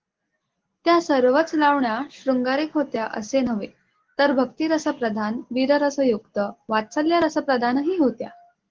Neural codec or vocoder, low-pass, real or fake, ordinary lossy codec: none; 7.2 kHz; real; Opus, 16 kbps